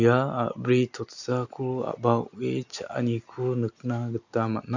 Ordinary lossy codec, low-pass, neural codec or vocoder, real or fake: none; 7.2 kHz; none; real